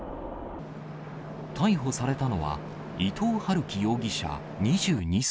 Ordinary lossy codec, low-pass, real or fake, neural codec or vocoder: none; none; real; none